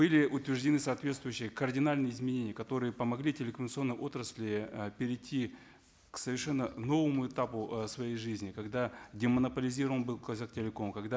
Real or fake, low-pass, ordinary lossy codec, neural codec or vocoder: real; none; none; none